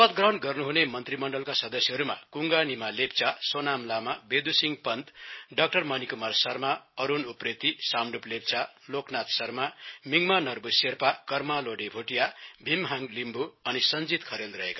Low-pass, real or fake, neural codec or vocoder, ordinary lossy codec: 7.2 kHz; real; none; MP3, 24 kbps